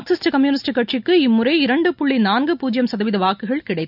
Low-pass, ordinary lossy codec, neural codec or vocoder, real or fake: 5.4 kHz; none; none; real